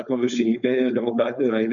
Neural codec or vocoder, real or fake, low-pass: codec, 16 kHz, 4.8 kbps, FACodec; fake; 7.2 kHz